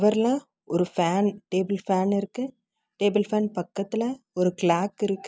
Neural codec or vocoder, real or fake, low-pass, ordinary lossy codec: none; real; none; none